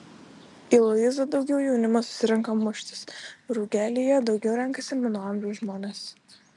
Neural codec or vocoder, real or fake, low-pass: none; real; 10.8 kHz